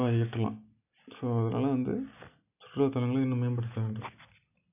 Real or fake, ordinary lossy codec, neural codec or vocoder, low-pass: real; none; none; 3.6 kHz